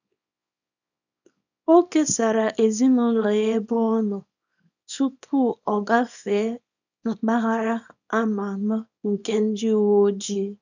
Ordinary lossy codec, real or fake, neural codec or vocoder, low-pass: none; fake; codec, 24 kHz, 0.9 kbps, WavTokenizer, small release; 7.2 kHz